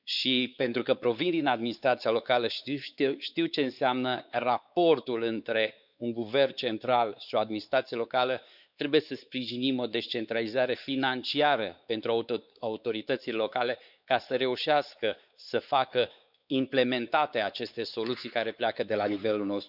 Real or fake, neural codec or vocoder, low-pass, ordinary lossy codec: fake; codec, 16 kHz, 4 kbps, X-Codec, WavLM features, trained on Multilingual LibriSpeech; 5.4 kHz; none